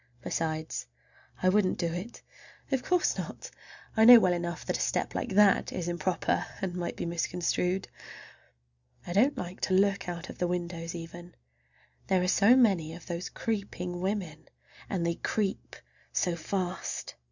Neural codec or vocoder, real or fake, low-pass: none; real; 7.2 kHz